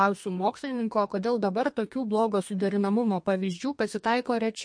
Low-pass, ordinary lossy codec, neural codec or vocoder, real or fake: 9.9 kHz; MP3, 48 kbps; codec, 32 kHz, 1.9 kbps, SNAC; fake